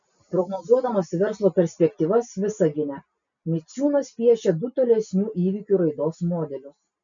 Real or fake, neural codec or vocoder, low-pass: real; none; 7.2 kHz